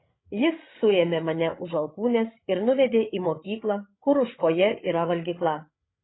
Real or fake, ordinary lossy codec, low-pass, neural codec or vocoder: fake; AAC, 16 kbps; 7.2 kHz; codec, 16 kHz, 16 kbps, FreqCodec, larger model